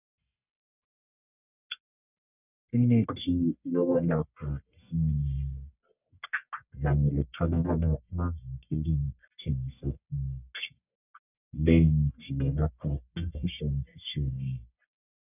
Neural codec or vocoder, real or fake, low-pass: codec, 44.1 kHz, 1.7 kbps, Pupu-Codec; fake; 3.6 kHz